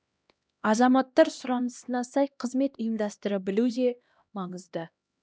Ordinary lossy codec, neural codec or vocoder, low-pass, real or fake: none; codec, 16 kHz, 1 kbps, X-Codec, HuBERT features, trained on LibriSpeech; none; fake